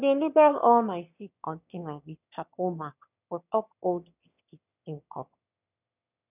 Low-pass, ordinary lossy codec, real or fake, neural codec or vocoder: 3.6 kHz; none; fake; autoencoder, 22.05 kHz, a latent of 192 numbers a frame, VITS, trained on one speaker